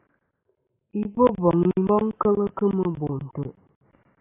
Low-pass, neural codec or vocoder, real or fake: 3.6 kHz; none; real